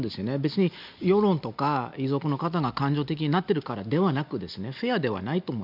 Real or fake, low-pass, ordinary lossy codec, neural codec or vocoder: real; 5.4 kHz; none; none